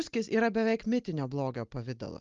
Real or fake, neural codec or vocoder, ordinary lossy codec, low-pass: real; none; Opus, 32 kbps; 7.2 kHz